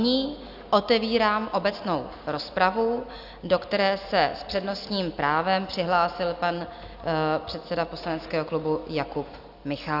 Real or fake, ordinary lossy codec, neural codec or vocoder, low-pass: real; AAC, 48 kbps; none; 5.4 kHz